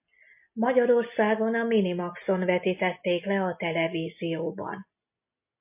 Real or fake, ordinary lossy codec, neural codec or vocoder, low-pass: real; MP3, 24 kbps; none; 3.6 kHz